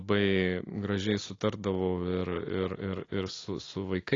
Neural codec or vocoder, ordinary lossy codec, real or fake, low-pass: none; AAC, 32 kbps; real; 7.2 kHz